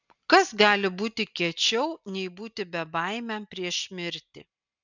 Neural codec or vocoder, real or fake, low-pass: none; real; 7.2 kHz